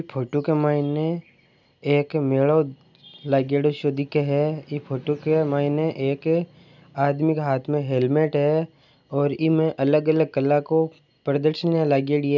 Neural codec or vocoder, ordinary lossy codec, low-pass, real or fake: none; none; 7.2 kHz; real